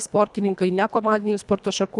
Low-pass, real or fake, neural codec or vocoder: 10.8 kHz; fake; codec, 24 kHz, 1.5 kbps, HILCodec